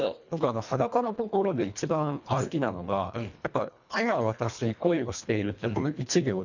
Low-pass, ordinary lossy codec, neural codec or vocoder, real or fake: 7.2 kHz; none; codec, 24 kHz, 1.5 kbps, HILCodec; fake